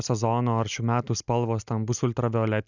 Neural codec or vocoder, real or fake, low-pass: none; real; 7.2 kHz